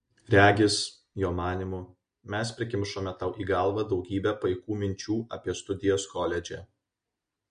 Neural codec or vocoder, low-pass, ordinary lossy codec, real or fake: none; 9.9 kHz; MP3, 48 kbps; real